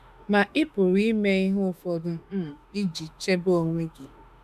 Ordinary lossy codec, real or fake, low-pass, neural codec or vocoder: none; fake; 14.4 kHz; autoencoder, 48 kHz, 32 numbers a frame, DAC-VAE, trained on Japanese speech